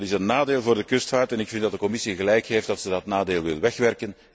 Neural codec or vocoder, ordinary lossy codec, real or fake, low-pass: none; none; real; none